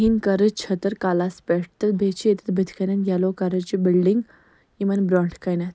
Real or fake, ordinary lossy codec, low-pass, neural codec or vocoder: real; none; none; none